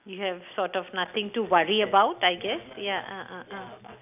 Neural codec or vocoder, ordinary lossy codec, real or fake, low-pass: none; none; real; 3.6 kHz